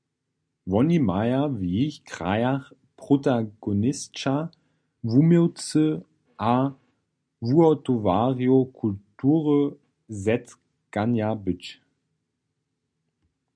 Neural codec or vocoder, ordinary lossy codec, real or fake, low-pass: none; MP3, 96 kbps; real; 9.9 kHz